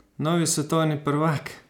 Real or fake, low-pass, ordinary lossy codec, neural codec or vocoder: real; 19.8 kHz; none; none